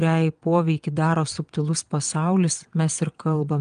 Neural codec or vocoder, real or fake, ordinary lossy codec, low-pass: vocoder, 22.05 kHz, 80 mel bands, WaveNeXt; fake; Opus, 32 kbps; 9.9 kHz